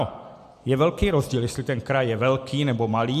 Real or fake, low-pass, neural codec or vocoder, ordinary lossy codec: real; 14.4 kHz; none; MP3, 96 kbps